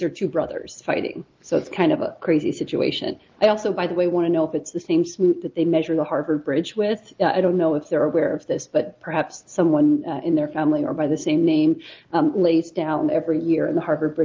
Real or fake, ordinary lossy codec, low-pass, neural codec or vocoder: real; Opus, 32 kbps; 7.2 kHz; none